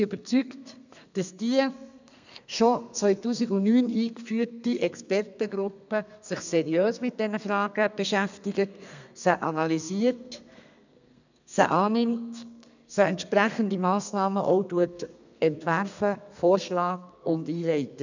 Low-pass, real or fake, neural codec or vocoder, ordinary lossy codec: 7.2 kHz; fake; codec, 32 kHz, 1.9 kbps, SNAC; none